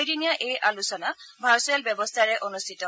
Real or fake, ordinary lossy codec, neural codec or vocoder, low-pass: real; none; none; none